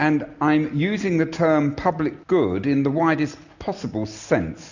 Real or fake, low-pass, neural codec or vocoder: real; 7.2 kHz; none